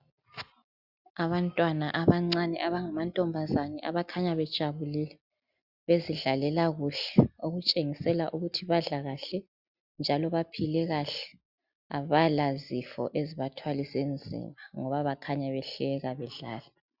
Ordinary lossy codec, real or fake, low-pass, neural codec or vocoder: Opus, 64 kbps; real; 5.4 kHz; none